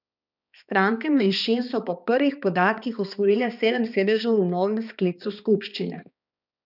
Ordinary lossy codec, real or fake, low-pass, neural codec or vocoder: none; fake; 5.4 kHz; codec, 16 kHz, 2 kbps, X-Codec, HuBERT features, trained on balanced general audio